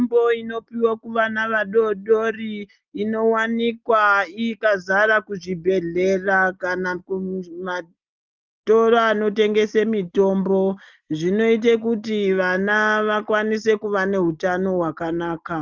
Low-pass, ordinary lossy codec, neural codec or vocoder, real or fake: 7.2 kHz; Opus, 32 kbps; none; real